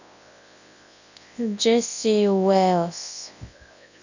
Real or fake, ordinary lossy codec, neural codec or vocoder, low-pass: fake; none; codec, 24 kHz, 0.9 kbps, WavTokenizer, large speech release; 7.2 kHz